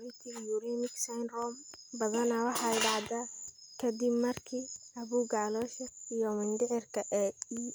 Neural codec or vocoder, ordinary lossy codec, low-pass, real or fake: none; none; none; real